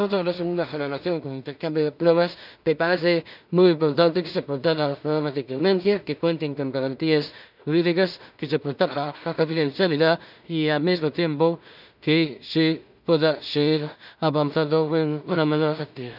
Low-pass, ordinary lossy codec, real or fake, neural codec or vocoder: 5.4 kHz; none; fake; codec, 16 kHz in and 24 kHz out, 0.4 kbps, LongCat-Audio-Codec, two codebook decoder